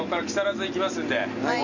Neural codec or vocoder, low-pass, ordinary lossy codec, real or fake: none; 7.2 kHz; none; real